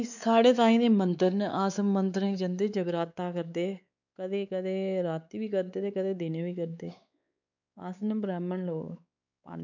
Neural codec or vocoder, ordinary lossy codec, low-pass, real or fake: codec, 16 kHz, 4 kbps, X-Codec, WavLM features, trained on Multilingual LibriSpeech; none; 7.2 kHz; fake